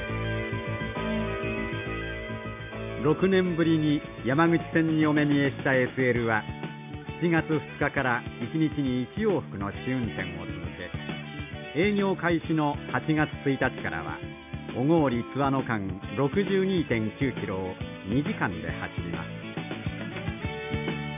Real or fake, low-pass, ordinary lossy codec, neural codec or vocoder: real; 3.6 kHz; AAC, 32 kbps; none